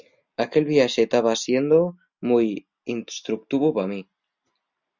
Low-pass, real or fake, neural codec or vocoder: 7.2 kHz; real; none